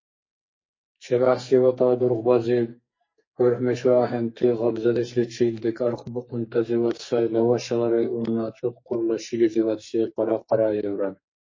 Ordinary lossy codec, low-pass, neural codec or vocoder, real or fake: MP3, 32 kbps; 7.2 kHz; codec, 44.1 kHz, 2.6 kbps, SNAC; fake